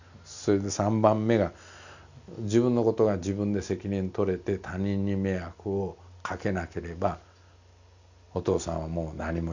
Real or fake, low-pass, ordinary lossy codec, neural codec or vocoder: real; 7.2 kHz; none; none